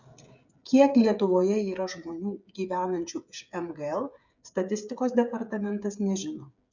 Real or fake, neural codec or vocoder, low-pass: fake; codec, 16 kHz, 8 kbps, FreqCodec, smaller model; 7.2 kHz